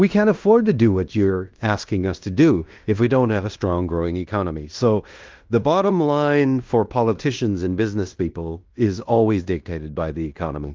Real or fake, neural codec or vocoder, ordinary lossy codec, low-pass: fake; codec, 16 kHz in and 24 kHz out, 0.9 kbps, LongCat-Audio-Codec, fine tuned four codebook decoder; Opus, 32 kbps; 7.2 kHz